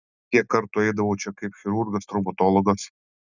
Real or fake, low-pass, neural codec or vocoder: real; 7.2 kHz; none